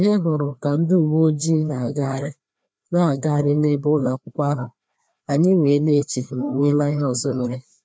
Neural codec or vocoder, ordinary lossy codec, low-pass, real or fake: codec, 16 kHz, 2 kbps, FreqCodec, larger model; none; none; fake